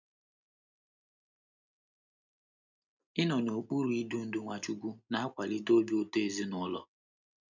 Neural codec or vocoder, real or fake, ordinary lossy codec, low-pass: none; real; none; 7.2 kHz